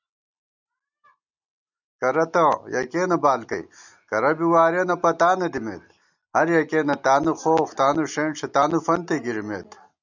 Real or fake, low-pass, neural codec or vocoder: real; 7.2 kHz; none